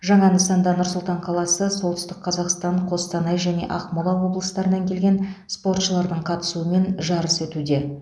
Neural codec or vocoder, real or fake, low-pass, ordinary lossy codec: none; real; none; none